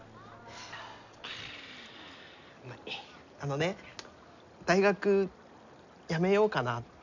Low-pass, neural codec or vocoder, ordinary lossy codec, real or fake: 7.2 kHz; none; none; real